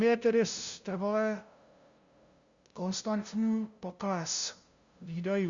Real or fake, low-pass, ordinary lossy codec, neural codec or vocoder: fake; 7.2 kHz; Opus, 64 kbps; codec, 16 kHz, 0.5 kbps, FunCodec, trained on LibriTTS, 25 frames a second